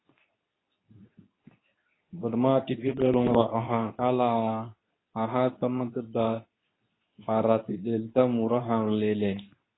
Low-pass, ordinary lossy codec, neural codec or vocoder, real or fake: 7.2 kHz; AAC, 16 kbps; codec, 24 kHz, 0.9 kbps, WavTokenizer, medium speech release version 2; fake